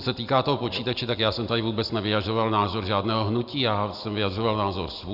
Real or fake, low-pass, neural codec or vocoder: real; 5.4 kHz; none